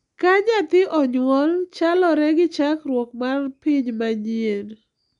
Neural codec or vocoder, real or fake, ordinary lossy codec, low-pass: none; real; none; 10.8 kHz